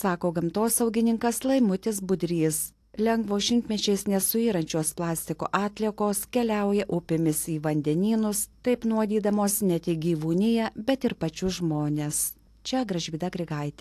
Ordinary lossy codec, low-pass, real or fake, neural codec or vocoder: AAC, 64 kbps; 14.4 kHz; real; none